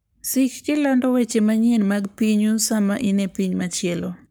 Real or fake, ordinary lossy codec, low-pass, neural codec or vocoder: fake; none; none; codec, 44.1 kHz, 7.8 kbps, Pupu-Codec